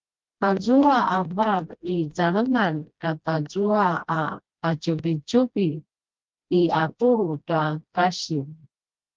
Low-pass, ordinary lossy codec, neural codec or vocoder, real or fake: 7.2 kHz; Opus, 32 kbps; codec, 16 kHz, 1 kbps, FreqCodec, smaller model; fake